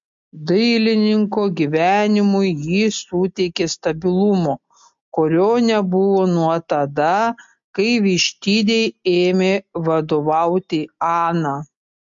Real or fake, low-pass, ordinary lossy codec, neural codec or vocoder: real; 7.2 kHz; MP3, 48 kbps; none